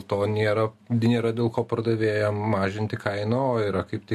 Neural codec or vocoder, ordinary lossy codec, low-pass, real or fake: none; MP3, 64 kbps; 14.4 kHz; real